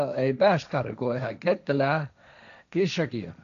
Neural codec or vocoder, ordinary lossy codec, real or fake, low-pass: codec, 16 kHz, 1.1 kbps, Voila-Tokenizer; none; fake; 7.2 kHz